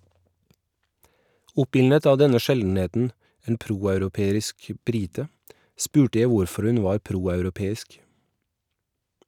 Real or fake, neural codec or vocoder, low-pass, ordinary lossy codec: real; none; 19.8 kHz; none